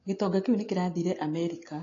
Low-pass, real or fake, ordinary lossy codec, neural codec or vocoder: 7.2 kHz; real; AAC, 32 kbps; none